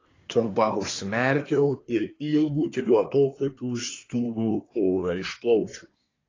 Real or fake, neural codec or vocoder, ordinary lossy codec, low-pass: fake; codec, 24 kHz, 1 kbps, SNAC; AAC, 32 kbps; 7.2 kHz